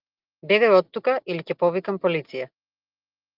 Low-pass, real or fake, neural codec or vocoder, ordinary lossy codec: 5.4 kHz; real; none; Opus, 24 kbps